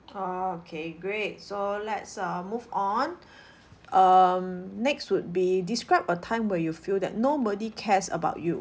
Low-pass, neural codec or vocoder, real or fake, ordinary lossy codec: none; none; real; none